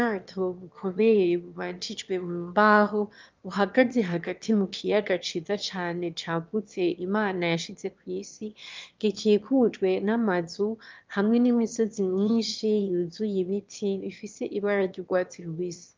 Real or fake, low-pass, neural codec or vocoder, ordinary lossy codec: fake; 7.2 kHz; autoencoder, 22.05 kHz, a latent of 192 numbers a frame, VITS, trained on one speaker; Opus, 24 kbps